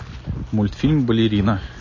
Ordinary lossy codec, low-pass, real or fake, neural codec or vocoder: MP3, 32 kbps; 7.2 kHz; real; none